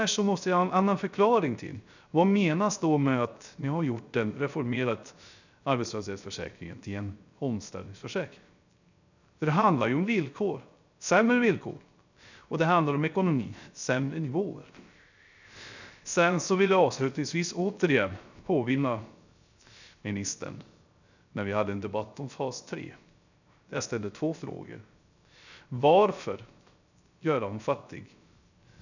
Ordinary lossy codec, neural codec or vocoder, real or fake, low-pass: none; codec, 16 kHz, 0.3 kbps, FocalCodec; fake; 7.2 kHz